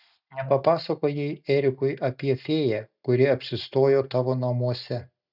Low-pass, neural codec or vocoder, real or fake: 5.4 kHz; none; real